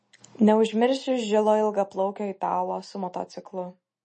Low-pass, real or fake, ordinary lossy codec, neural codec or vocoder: 10.8 kHz; real; MP3, 32 kbps; none